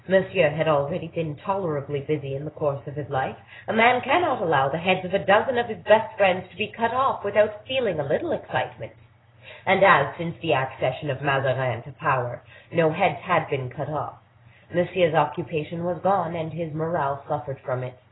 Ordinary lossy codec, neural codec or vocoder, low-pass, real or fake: AAC, 16 kbps; none; 7.2 kHz; real